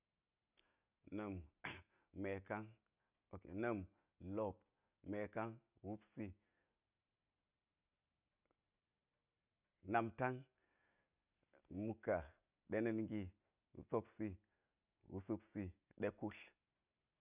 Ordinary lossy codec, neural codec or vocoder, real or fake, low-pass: none; none; real; 3.6 kHz